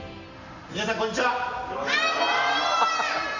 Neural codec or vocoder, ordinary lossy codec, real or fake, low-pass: none; none; real; 7.2 kHz